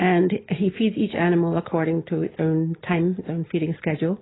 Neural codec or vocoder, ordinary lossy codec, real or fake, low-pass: none; AAC, 16 kbps; real; 7.2 kHz